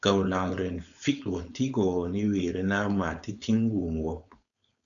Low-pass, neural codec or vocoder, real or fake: 7.2 kHz; codec, 16 kHz, 4.8 kbps, FACodec; fake